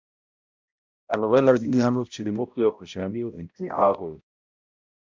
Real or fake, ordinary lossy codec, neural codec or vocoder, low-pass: fake; MP3, 64 kbps; codec, 16 kHz, 0.5 kbps, X-Codec, HuBERT features, trained on balanced general audio; 7.2 kHz